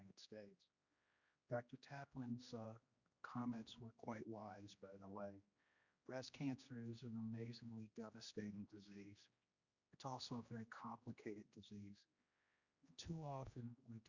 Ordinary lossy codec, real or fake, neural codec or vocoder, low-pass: Opus, 64 kbps; fake; codec, 16 kHz, 1 kbps, X-Codec, HuBERT features, trained on general audio; 7.2 kHz